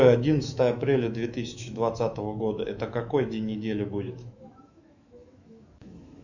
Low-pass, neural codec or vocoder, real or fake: 7.2 kHz; autoencoder, 48 kHz, 128 numbers a frame, DAC-VAE, trained on Japanese speech; fake